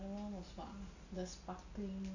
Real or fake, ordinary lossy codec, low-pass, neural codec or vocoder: real; none; 7.2 kHz; none